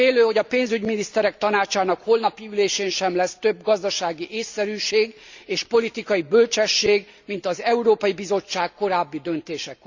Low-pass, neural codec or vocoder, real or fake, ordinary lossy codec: 7.2 kHz; none; real; Opus, 64 kbps